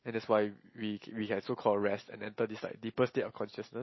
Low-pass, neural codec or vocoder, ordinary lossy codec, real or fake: 7.2 kHz; none; MP3, 24 kbps; real